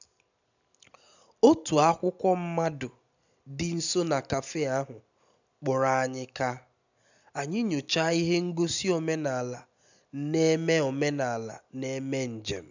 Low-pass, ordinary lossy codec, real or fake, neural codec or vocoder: 7.2 kHz; none; real; none